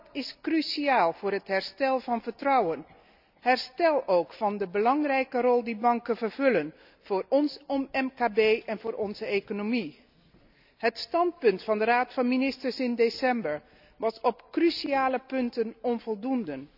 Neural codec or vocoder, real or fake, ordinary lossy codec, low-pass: none; real; none; 5.4 kHz